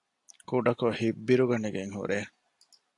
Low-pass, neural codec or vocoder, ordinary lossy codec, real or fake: 10.8 kHz; none; AAC, 48 kbps; real